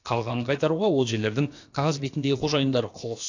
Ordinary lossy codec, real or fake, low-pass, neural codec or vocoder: AAC, 48 kbps; fake; 7.2 kHz; codec, 16 kHz, about 1 kbps, DyCAST, with the encoder's durations